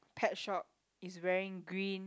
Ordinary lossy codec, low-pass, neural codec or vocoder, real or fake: none; none; none; real